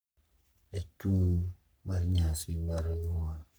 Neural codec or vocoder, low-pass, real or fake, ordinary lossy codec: codec, 44.1 kHz, 3.4 kbps, Pupu-Codec; none; fake; none